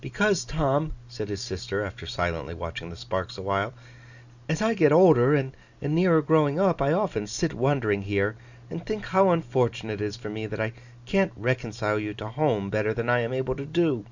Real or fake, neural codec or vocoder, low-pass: real; none; 7.2 kHz